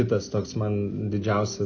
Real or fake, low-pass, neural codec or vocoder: real; 7.2 kHz; none